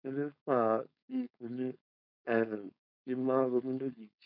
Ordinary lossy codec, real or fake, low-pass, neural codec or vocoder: none; fake; 5.4 kHz; codec, 16 kHz, 4.8 kbps, FACodec